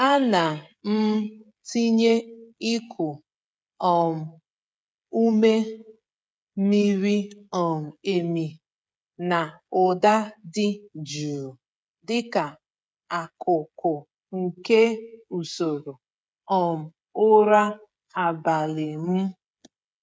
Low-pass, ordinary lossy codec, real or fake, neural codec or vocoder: none; none; fake; codec, 16 kHz, 16 kbps, FreqCodec, smaller model